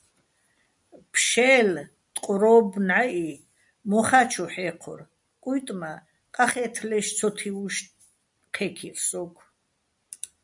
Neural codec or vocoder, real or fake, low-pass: none; real; 10.8 kHz